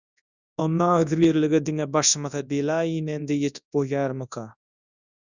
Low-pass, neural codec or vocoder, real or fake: 7.2 kHz; codec, 24 kHz, 0.9 kbps, WavTokenizer, large speech release; fake